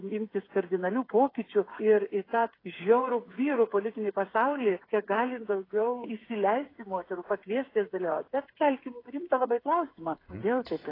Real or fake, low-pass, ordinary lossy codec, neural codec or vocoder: fake; 5.4 kHz; AAC, 24 kbps; codec, 16 kHz, 8 kbps, FreqCodec, smaller model